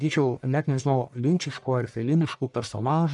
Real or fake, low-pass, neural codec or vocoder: fake; 10.8 kHz; codec, 44.1 kHz, 1.7 kbps, Pupu-Codec